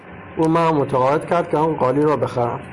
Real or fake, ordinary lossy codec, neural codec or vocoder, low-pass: real; Opus, 64 kbps; none; 10.8 kHz